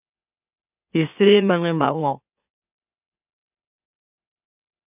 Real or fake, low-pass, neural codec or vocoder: fake; 3.6 kHz; autoencoder, 44.1 kHz, a latent of 192 numbers a frame, MeloTTS